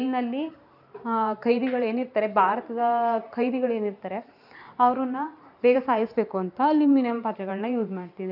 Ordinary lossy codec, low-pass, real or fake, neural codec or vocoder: none; 5.4 kHz; fake; vocoder, 44.1 kHz, 128 mel bands every 512 samples, BigVGAN v2